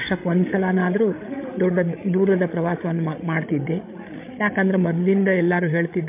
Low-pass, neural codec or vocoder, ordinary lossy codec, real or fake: 3.6 kHz; codec, 16 kHz, 16 kbps, FreqCodec, larger model; MP3, 24 kbps; fake